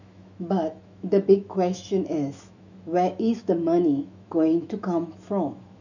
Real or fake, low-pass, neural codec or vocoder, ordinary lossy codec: fake; 7.2 kHz; autoencoder, 48 kHz, 128 numbers a frame, DAC-VAE, trained on Japanese speech; none